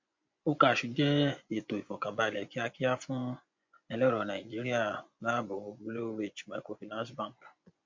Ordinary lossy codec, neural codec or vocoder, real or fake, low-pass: MP3, 48 kbps; vocoder, 24 kHz, 100 mel bands, Vocos; fake; 7.2 kHz